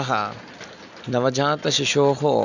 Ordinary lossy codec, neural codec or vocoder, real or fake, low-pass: none; none; real; 7.2 kHz